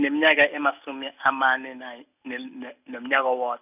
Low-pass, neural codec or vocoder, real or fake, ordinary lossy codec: 3.6 kHz; none; real; none